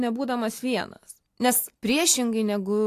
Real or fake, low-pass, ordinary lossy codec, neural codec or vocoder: real; 14.4 kHz; AAC, 64 kbps; none